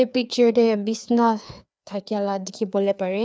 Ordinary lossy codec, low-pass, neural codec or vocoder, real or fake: none; none; codec, 16 kHz, 2 kbps, FreqCodec, larger model; fake